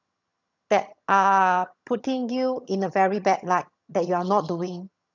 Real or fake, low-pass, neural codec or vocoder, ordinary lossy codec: fake; 7.2 kHz; vocoder, 22.05 kHz, 80 mel bands, HiFi-GAN; none